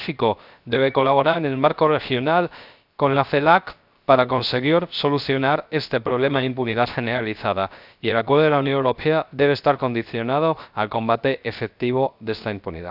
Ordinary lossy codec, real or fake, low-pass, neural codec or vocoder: none; fake; 5.4 kHz; codec, 16 kHz, 0.3 kbps, FocalCodec